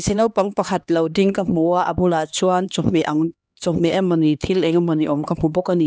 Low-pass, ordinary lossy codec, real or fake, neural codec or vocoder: none; none; fake; codec, 16 kHz, 2 kbps, X-Codec, HuBERT features, trained on LibriSpeech